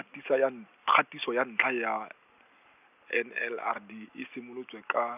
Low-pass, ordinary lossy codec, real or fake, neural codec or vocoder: 3.6 kHz; none; real; none